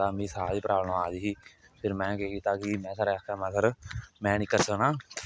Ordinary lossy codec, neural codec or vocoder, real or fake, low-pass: none; none; real; none